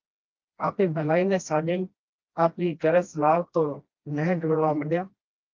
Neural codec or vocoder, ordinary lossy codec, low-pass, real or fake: codec, 16 kHz, 1 kbps, FreqCodec, smaller model; Opus, 32 kbps; 7.2 kHz; fake